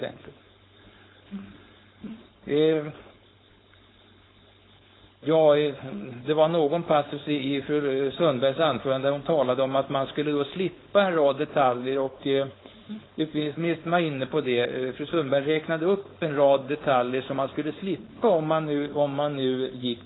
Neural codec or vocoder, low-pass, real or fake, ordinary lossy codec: codec, 16 kHz, 4.8 kbps, FACodec; 7.2 kHz; fake; AAC, 16 kbps